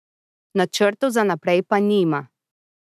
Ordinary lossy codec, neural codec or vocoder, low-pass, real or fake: none; none; 14.4 kHz; real